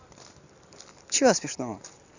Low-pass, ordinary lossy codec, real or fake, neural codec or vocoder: 7.2 kHz; none; fake; vocoder, 44.1 kHz, 128 mel bands every 256 samples, BigVGAN v2